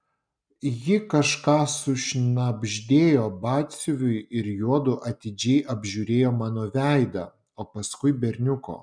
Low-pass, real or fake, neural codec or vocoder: 9.9 kHz; real; none